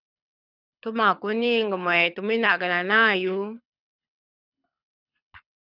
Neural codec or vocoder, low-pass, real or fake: codec, 24 kHz, 6 kbps, HILCodec; 5.4 kHz; fake